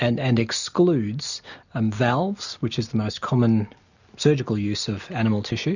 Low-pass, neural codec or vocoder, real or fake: 7.2 kHz; none; real